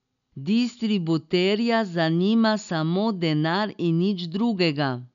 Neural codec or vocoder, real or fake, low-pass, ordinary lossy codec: none; real; 7.2 kHz; none